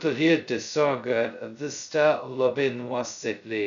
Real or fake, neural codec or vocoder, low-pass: fake; codec, 16 kHz, 0.2 kbps, FocalCodec; 7.2 kHz